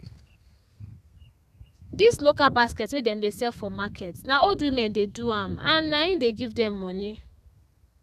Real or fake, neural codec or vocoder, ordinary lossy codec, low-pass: fake; codec, 32 kHz, 1.9 kbps, SNAC; none; 14.4 kHz